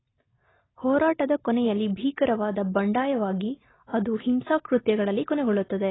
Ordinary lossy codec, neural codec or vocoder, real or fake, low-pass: AAC, 16 kbps; none; real; 7.2 kHz